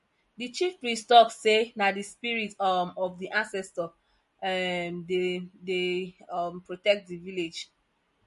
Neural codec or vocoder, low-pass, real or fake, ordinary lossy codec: none; 14.4 kHz; real; MP3, 48 kbps